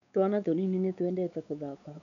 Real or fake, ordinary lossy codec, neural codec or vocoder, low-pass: fake; none; codec, 16 kHz, 4 kbps, X-Codec, WavLM features, trained on Multilingual LibriSpeech; 7.2 kHz